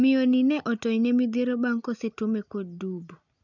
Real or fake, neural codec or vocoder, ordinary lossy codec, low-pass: real; none; none; 7.2 kHz